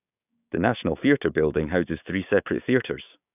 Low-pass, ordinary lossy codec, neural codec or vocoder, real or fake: 3.6 kHz; none; codec, 16 kHz, 6 kbps, DAC; fake